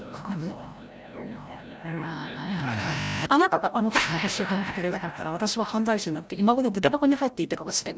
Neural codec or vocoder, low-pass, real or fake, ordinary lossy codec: codec, 16 kHz, 0.5 kbps, FreqCodec, larger model; none; fake; none